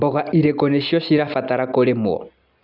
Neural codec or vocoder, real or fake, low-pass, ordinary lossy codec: none; real; 5.4 kHz; none